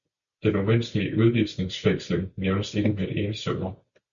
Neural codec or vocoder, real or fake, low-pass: none; real; 7.2 kHz